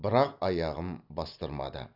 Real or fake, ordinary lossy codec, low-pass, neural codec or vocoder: real; none; 5.4 kHz; none